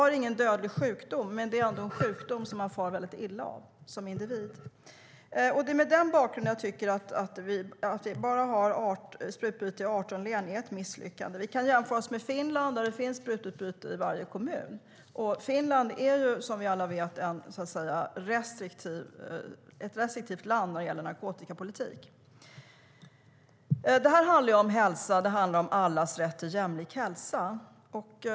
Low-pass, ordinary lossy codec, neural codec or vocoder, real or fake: none; none; none; real